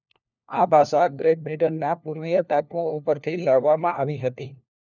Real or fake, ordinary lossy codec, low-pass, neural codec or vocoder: fake; none; 7.2 kHz; codec, 16 kHz, 1 kbps, FunCodec, trained on LibriTTS, 50 frames a second